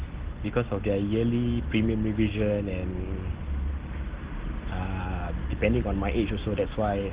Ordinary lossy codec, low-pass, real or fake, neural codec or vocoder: Opus, 16 kbps; 3.6 kHz; real; none